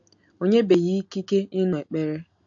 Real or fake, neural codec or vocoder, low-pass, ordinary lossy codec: real; none; 7.2 kHz; AAC, 64 kbps